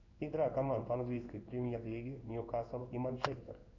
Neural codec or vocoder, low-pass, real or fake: codec, 16 kHz in and 24 kHz out, 1 kbps, XY-Tokenizer; 7.2 kHz; fake